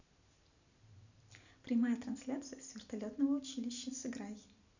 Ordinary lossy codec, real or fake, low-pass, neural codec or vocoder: none; real; 7.2 kHz; none